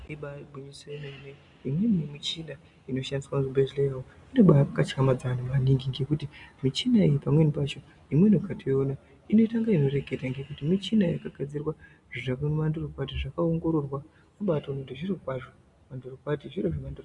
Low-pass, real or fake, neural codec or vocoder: 10.8 kHz; real; none